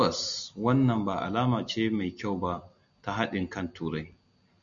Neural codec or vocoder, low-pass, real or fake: none; 7.2 kHz; real